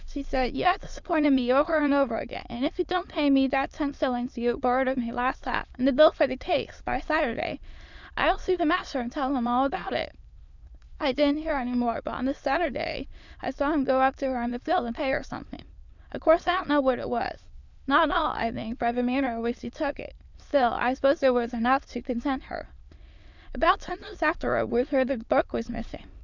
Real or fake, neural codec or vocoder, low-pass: fake; autoencoder, 22.05 kHz, a latent of 192 numbers a frame, VITS, trained on many speakers; 7.2 kHz